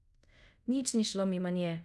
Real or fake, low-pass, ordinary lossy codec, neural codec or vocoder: fake; none; none; codec, 24 kHz, 0.5 kbps, DualCodec